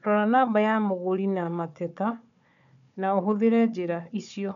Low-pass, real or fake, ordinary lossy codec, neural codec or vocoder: 7.2 kHz; fake; none; codec, 16 kHz, 4 kbps, FunCodec, trained on Chinese and English, 50 frames a second